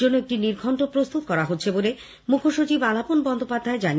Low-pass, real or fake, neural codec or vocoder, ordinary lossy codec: none; real; none; none